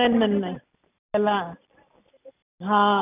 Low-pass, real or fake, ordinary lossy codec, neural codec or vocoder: 3.6 kHz; real; none; none